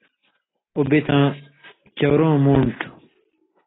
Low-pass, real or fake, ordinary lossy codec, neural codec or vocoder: 7.2 kHz; real; AAC, 16 kbps; none